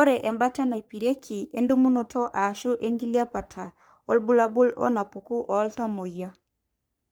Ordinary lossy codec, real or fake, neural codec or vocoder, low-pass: none; fake; codec, 44.1 kHz, 3.4 kbps, Pupu-Codec; none